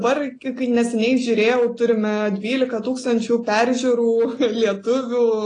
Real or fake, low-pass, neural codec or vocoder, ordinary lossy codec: real; 9.9 kHz; none; AAC, 32 kbps